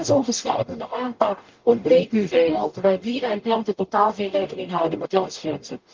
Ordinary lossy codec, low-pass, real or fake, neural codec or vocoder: Opus, 32 kbps; 7.2 kHz; fake; codec, 44.1 kHz, 0.9 kbps, DAC